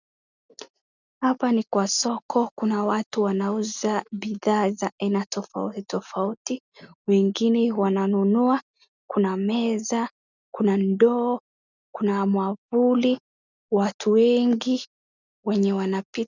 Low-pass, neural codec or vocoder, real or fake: 7.2 kHz; none; real